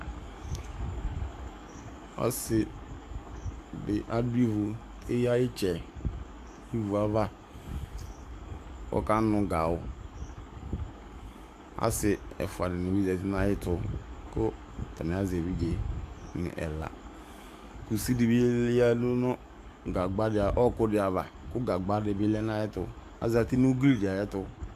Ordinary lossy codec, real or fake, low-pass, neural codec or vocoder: MP3, 96 kbps; fake; 14.4 kHz; codec, 44.1 kHz, 7.8 kbps, DAC